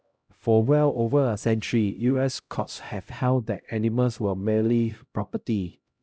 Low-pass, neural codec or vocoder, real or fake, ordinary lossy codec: none; codec, 16 kHz, 0.5 kbps, X-Codec, HuBERT features, trained on LibriSpeech; fake; none